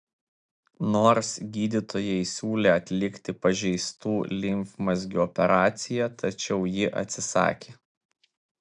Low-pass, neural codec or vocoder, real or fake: 9.9 kHz; none; real